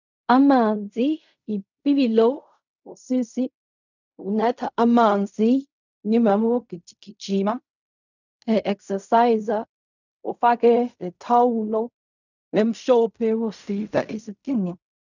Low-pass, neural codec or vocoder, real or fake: 7.2 kHz; codec, 16 kHz in and 24 kHz out, 0.4 kbps, LongCat-Audio-Codec, fine tuned four codebook decoder; fake